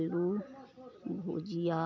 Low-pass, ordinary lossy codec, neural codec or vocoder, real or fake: 7.2 kHz; none; none; real